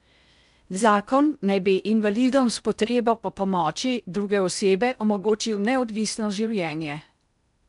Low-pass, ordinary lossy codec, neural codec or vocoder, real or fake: 10.8 kHz; none; codec, 16 kHz in and 24 kHz out, 0.6 kbps, FocalCodec, streaming, 4096 codes; fake